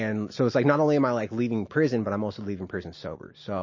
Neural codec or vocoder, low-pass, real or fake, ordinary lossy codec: none; 7.2 kHz; real; MP3, 32 kbps